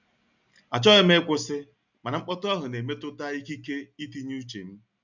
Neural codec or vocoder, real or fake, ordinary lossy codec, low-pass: none; real; none; 7.2 kHz